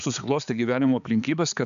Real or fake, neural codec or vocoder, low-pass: fake; codec, 16 kHz, 4 kbps, X-Codec, HuBERT features, trained on balanced general audio; 7.2 kHz